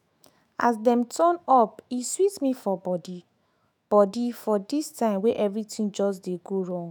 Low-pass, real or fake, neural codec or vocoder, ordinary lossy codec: none; fake; autoencoder, 48 kHz, 128 numbers a frame, DAC-VAE, trained on Japanese speech; none